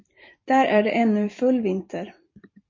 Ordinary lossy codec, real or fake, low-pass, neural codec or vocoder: MP3, 32 kbps; fake; 7.2 kHz; vocoder, 44.1 kHz, 128 mel bands every 256 samples, BigVGAN v2